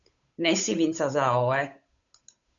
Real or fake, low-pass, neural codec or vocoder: fake; 7.2 kHz; codec, 16 kHz, 8 kbps, FunCodec, trained on Chinese and English, 25 frames a second